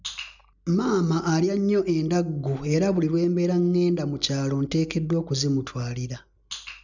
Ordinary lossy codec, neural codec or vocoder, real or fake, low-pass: none; none; real; 7.2 kHz